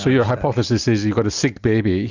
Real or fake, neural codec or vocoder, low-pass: real; none; 7.2 kHz